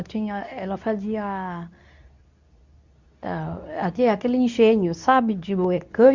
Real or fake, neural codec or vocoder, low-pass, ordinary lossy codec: fake; codec, 24 kHz, 0.9 kbps, WavTokenizer, medium speech release version 2; 7.2 kHz; Opus, 64 kbps